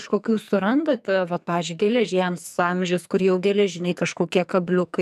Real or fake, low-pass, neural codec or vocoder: fake; 14.4 kHz; codec, 44.1 kHz, 2.6 kbps, SNAC